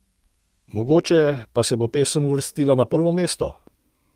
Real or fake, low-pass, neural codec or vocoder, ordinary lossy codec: fake; 14.4 kHz; codec, 32 kHz, 1.9 kbps, SNAC; Opus, 32 kbps